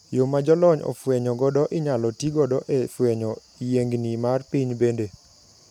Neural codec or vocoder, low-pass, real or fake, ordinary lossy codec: none; 19.8 kHz; real; none